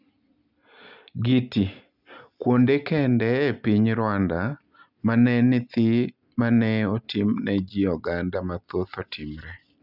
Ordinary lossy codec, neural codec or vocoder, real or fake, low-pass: none; none; real; 5.4 kHz